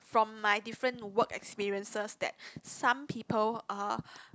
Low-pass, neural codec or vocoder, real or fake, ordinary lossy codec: none; none; real; none